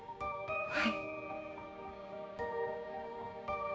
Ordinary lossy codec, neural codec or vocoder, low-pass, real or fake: none; codec, 16 kHz, 6 kbps, DAC; none; fake